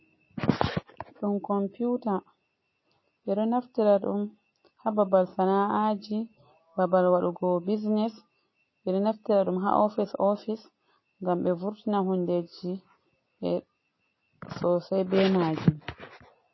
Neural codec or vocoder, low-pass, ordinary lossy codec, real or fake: none; 7.2 kHz; MP3, 24 kbps; real